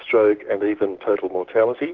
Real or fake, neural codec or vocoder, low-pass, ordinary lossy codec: fake; codec, 16 kHz, 6 kbps, DAC; 7.2 kHz; Opus, 16 kbps